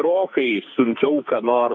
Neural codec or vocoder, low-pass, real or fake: codec, 44.1 kHz, 2.6 kbps, SNAC; 7.2 kHz; fake